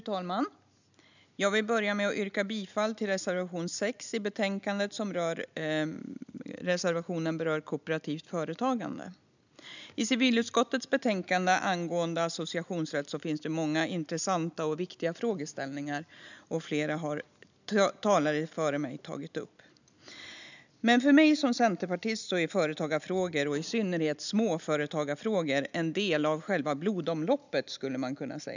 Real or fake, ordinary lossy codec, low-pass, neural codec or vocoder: real; none; 7.2 kHz; none